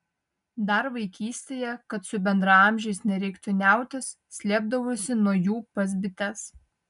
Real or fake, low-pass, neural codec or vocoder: real; 10.8 kHz; none